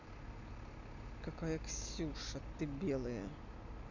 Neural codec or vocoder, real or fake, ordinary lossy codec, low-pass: none; real; none; 7.2 kHz